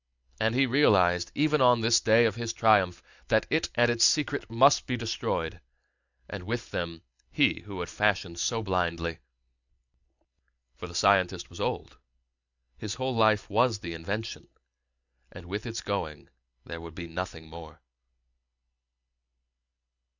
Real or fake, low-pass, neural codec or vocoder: real; 7.2 kHz; none